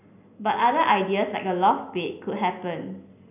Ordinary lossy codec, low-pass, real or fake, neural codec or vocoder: none; 3.6 kHz; real; none